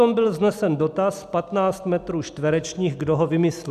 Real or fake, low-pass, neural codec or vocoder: real; 14.4 kHz; none